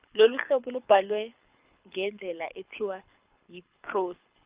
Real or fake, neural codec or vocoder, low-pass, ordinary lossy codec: fake; codec, 24 kHz, 6 kbps, HILCodec; 3.6 kHz; Opus, 24 kbps